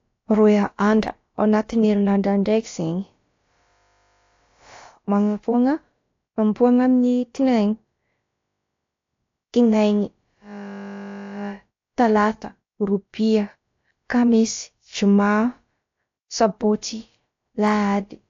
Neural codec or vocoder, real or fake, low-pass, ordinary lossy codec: codec, 16 kHz, about 1 kbps, DyCAST, with the encoder's durations; fake; 7.2 kHz; AAC, 48 kbps